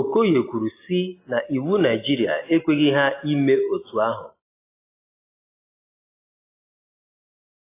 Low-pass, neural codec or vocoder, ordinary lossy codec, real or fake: 3.6 kHz; none; AAC, 24 kbps; real